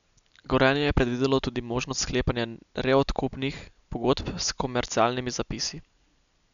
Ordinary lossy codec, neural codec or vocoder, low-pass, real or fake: none; none; 7.2 kHz; real